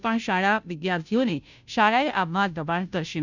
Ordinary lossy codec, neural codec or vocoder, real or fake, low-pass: none; codec, 16 kHz, 0.5 kbps, FunCodec, trained on Chinese and English, 25 frames a second; fake; 7.2 kHz